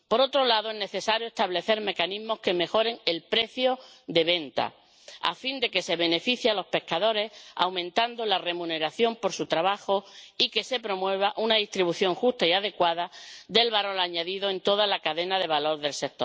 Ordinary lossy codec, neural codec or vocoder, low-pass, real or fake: none; none; none; real